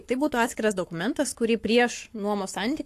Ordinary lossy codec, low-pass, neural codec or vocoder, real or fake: AAC, 64 kbps; 14.4 kHz; codec, 44.1 kHz, 7.8 kbps, Pupu-Codec; fake